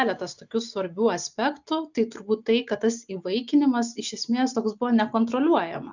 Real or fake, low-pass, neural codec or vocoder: real; 7.2 kHz; none